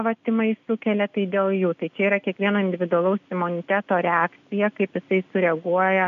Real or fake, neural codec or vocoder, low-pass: real; none; 7.2 kHz